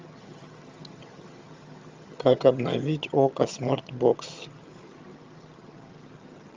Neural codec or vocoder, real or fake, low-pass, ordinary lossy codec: vocoder, 22.05 kHz, 80 mel bands, HiFi-GAN; fake; 7.2 kHz; Opus, 32 kbps